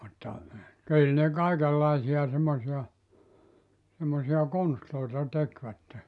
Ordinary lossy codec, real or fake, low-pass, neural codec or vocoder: none; real; 10.8 kHz; none